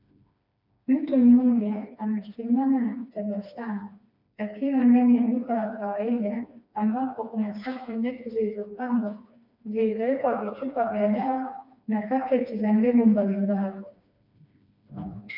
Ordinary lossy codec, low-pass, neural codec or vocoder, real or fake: MP3, 48 kbps; 5.4 kHz; codec, 16 kHz, 2 kbps, FreqCodec, smaller model; fake